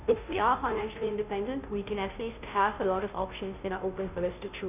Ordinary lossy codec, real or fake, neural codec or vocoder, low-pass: none; fake; codec, 16 kHz, 0.5 kbps, FunCodec, trained on Chinese and English, 25 frames a second; 3.6 kHz